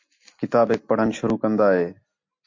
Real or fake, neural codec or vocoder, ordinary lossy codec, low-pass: real; none; MP3, 48 kbps; 7.2 kHz